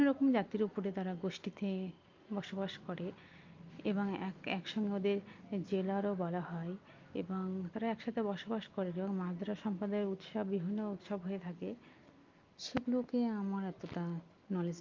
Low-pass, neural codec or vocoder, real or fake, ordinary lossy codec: 7.2 kHz; none; real; Opus, 24 kbps